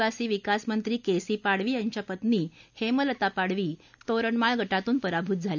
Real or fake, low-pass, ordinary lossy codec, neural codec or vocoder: real; 7.2 kHz; none; none